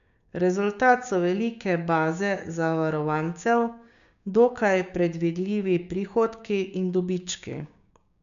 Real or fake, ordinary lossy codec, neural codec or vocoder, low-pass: fake; none; codec, 16 kHz, 6 kbps, DAC; 7.2 kHz